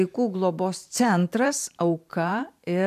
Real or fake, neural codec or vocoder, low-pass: fake; vocoder, 44.1 kHz, 128 mel bands every 512 samples, BigVGAN v2; 14.4 kHz